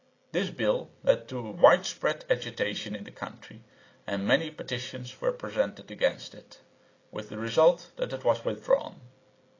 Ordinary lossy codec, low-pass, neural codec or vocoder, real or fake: AAC, 32 kbps; 7.2 kHz; none; real